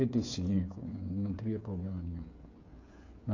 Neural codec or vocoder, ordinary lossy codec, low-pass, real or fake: codec, 16 kHz, 8 kbps, FreqCodec, smaller model; AAC, 48 kbps; 7.2 kHz; fake